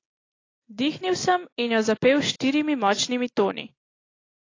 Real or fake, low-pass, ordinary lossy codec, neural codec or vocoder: real; 7.2 kHz; AAC, 32 kbps; none